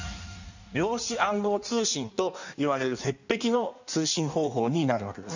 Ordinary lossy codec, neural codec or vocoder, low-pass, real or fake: none; codec, 16 kHz in and 24 kHz out, 1.1 kbps, FireRedTTS-2 codec; 7.2 kHz; fake